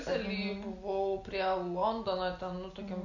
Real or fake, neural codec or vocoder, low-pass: real; none; 7.2 kHz